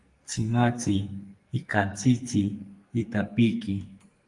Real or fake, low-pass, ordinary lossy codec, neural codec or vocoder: fake; 10.8 kHz; Opus, 32 kbps; codec, 44.1 kHz, 2.6 kbps, SNAC